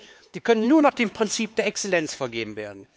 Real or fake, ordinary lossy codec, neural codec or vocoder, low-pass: fake; none; codec, 16 kHz, 4 kbps, X-Codec, HuBERT features, trained on LibriSpeech; none